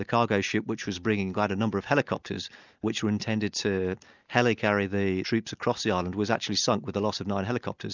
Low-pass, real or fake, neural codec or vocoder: 7.2 kHz; real; none